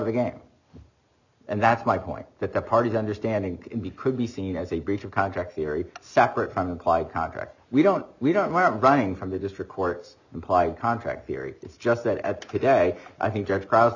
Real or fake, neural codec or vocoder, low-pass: real; none; 7.2 kHz